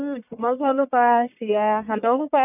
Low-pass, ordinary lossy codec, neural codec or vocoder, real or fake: 3.6 kHz; none; codec, 44.1 kHz, 1.7 kbps, Pupu-Codec; fake